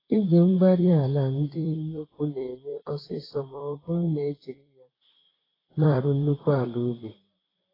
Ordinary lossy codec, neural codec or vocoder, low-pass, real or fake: AAC, 24 kbps; vocoder, 44.1 kHz, 128 mel bands, Pupu-Vocoder; 5.4 kHz; fake